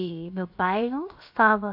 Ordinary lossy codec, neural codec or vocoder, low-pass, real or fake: AAC, 48 kbps; codec, 16 kHz, 0.7 kbps, FocalCodec; 5.4 kHz; fake